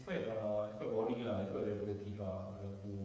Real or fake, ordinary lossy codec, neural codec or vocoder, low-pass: fake; none; codec, 16 kHz, 8 kbps, FreqCodec, smaller model; none